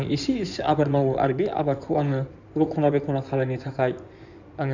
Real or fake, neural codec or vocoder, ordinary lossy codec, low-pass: fake; codec, 16 kHz in and 24 kHz out, 2.2 kbps, FireRedTTS-2 codec; none; 7.2 kHz